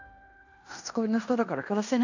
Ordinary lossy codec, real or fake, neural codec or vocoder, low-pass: none; fake; codec, 16 kHz in and 24 kHz out, 0.9 kbps, LongCat-Audio-Codec, fine tuned four codebook decoder; 7.2 kHz